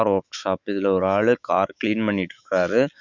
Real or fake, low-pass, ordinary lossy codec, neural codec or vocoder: fake; 7.2 kHz; Opus, 64 kbps; autoencoder, 48 kHz, 128 numbers a frame, DAC-VAE, trained on Japanese speech